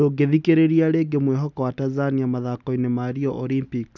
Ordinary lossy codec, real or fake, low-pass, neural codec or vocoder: none; real; 7.2 kHz; none